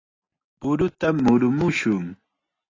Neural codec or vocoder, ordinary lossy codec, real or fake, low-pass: none; AAC, 32 kbps; real; 7.2 kHz